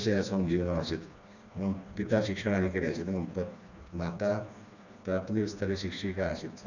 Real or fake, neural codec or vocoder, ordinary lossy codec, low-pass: fake; codec, 16 kHz, 2 kbps, FreqCodec, smaller model; none; 7.2 kHz